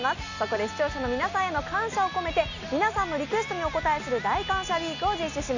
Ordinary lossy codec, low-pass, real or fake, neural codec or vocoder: none; 7.2 kHz; real; none